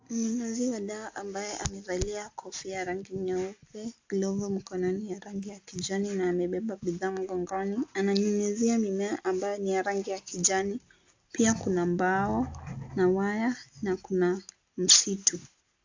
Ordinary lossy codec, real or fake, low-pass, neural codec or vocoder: AAC, 48 kbps; real; 7.2 kHz; none